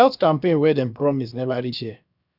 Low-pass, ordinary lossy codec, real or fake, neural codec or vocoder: 5.4 kHz; none; fake; codec, 16 kHz, about 1 kbps, DyCAST, with the encoder's durations